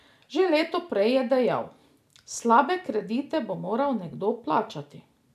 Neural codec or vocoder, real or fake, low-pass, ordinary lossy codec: none; real; 14.4 kHz; none